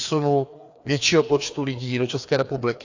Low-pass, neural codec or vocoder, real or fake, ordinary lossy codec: 7.2 kHz; codec, 16 kHz, 2 kbps, FreqCodec, larger model; fake; AAC, 48 kbps